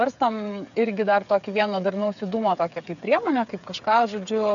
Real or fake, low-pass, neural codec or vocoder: fake; 7.2 kHz; codec, 16 kHz, 8 kbps, FreqCodec, smaller model